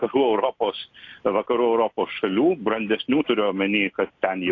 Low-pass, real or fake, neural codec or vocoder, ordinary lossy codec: 7.2 kHz; real; none; AAC, 48 kbps